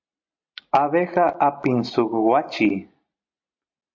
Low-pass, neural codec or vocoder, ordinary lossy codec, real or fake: 7.2 kHz; none; MP3, 48 kbps; real